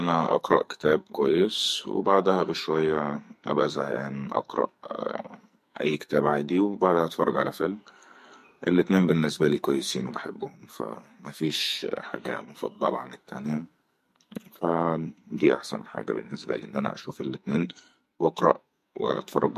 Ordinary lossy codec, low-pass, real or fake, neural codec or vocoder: MP3, 64 kbps; 14.4 kHz; fake; codec, 44.1 kHz, 2.6 kbps, SNAC